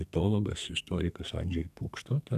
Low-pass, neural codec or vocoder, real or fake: 14.4 kHz; codec, 32 kHz, 1.9 kbps, SNAC; fake